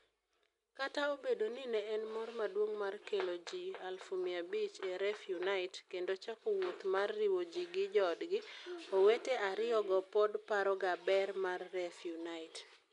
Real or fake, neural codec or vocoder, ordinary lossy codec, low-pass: real; none; none; 10.8 kHz